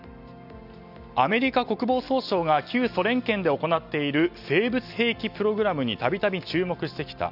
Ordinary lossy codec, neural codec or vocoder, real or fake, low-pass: none; none; real; 5.4 kHz